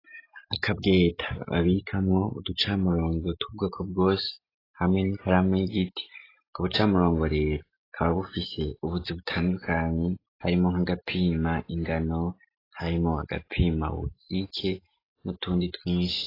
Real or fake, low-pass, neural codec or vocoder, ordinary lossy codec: real; 5.4 kHz; none; AAC, 24 kbps